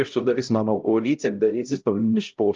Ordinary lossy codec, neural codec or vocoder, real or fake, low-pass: Opus, 32 kbps; codec, 16 kHz, 0.5 kbps, X-Codec, HuBERT features, trained on LibriSpeech; fake; 7.2 kHz